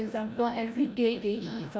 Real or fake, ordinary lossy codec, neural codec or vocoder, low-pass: fake; none; codec, 16 kHz, 0.5 kbps, FreqCodec, larger model; none